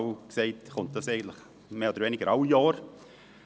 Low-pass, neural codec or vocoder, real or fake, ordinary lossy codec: none; none; real; none